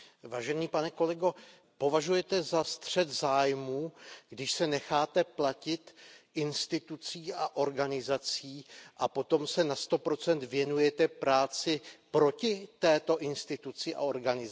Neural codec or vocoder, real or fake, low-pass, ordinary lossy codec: none; real; none; none